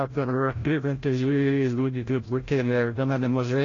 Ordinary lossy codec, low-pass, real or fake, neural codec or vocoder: AAC, 32 kbps; 7.2 kHz; fake; codec, 16 kHz, 0.5 kbps, FreqCodec, larger model